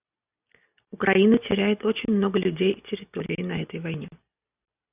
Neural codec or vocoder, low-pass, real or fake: none; 3.6 kHz; real